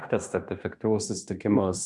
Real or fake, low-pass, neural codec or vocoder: fake; 10.8 kHz; codec, 16 kHz in and 24 kHz out, 0.9 kbps, LongCat-Audio-Codec, fine tuned four codebook decoder